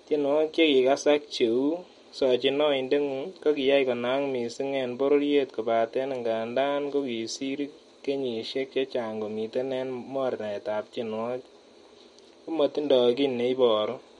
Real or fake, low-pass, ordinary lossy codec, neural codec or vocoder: real; 19.8 kHz; MP3, 48 kbps; none